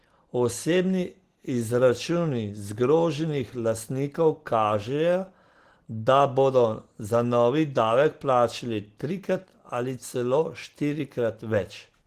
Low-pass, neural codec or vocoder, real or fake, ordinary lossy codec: 14.4 kHz; none; real; Opus, 16 kbps